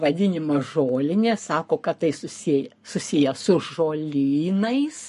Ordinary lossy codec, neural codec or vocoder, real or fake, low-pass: MP3, 48 kbps; codec, 44.1 kHz, 7.8 kbps, Pupu-Codec; fake; 14.4 kHz